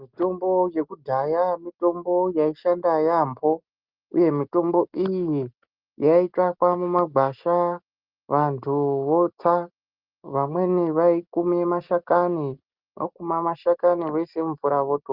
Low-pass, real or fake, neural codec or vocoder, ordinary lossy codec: 5.4 kHz; real; none; Opus, 32 kbps